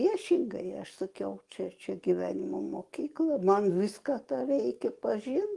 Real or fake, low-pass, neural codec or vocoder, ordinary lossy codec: fake; 10.8 kHz; codec, 44.1 kHz, 7.8 kbps, DAC; Opus, 24 kbps